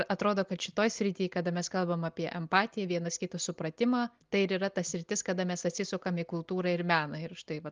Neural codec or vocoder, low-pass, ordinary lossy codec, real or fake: none; 7.2 kHz; Opus, 32 kbps; real